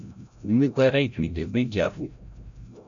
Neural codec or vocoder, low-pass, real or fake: codec, 16 kHz, 0.5 kbps, FreqCodec, larger model; 7.2 kHz; fake